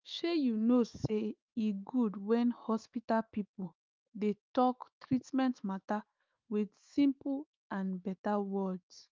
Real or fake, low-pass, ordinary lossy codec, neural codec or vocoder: fake; 7.2 kHz; Opus, 24 kbps; autoencoder, 48 kHz, 128 numbers a frame, DAC-VAE, trained on Japanese speech